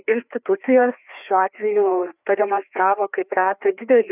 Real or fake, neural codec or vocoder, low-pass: fake; codec, 16 kHz, 2 kbps, FreqCodec, larger model; 3.6 kHz